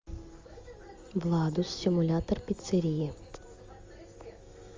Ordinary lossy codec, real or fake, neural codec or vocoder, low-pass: Opus, 32 kbps; real; none; 7.2 kHz